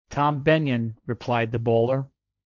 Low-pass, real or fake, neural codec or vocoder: 7.2 kHz; fake; codec, 16 kHz, 1.1 kbps, Voila-Tokenizer